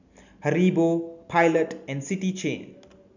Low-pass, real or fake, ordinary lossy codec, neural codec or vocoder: 7.2 kHz; real; none; none